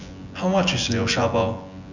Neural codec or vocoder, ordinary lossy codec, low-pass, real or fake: vocoder, 24 kHz, 100 mel bands, Vocos; none; 7.2 kHz; fake